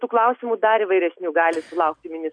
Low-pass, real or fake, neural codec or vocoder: 9.9 kHz; real; none